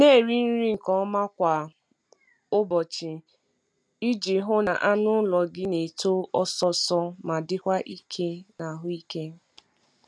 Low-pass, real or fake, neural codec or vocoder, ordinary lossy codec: none; real; none; none